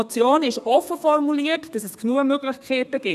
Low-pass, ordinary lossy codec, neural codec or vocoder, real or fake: 14.4 kHz; none; codec, 32 kHz, 1.9 kbps, SNAC; fake